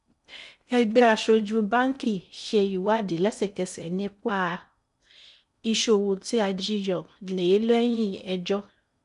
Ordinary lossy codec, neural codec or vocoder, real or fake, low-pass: none; codec, 16 kHz in and 24 kHz out, 0.6 kbps, FocalCodec, streaming, 2048 codes; fake; 10.8 kHz